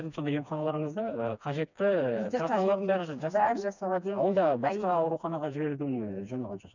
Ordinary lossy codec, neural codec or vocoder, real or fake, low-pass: none; codec, 16 kHz, 2 kbps, FreqCodec, smaller model; fake; 7.2 kHz